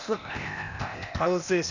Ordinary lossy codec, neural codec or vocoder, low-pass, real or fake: none; codec, 16 kHz, 0.8 kbps, ZipCodec; 7.2 kHz; fake